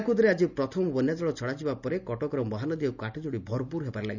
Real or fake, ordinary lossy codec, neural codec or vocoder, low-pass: real; none; none; 7.2 kHz